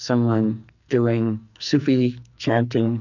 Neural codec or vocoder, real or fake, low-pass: codec, 44.1 kHz, 2.6 kbps, SNAC; fake; 7.2 kHz